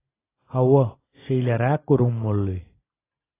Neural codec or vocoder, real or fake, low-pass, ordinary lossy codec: none; real; 3.6 kHz; AAC, 16 kbps